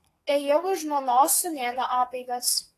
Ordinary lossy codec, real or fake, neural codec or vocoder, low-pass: AAC, 48 kbps; fake; codec, 32 kHz, 1.9 kbps, SNAC; 14.4 kHz